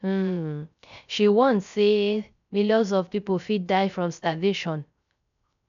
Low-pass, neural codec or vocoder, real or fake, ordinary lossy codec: 7.2 kHz; codec, 16 kHz, 0.3 kbps, FocalCodec; fake; none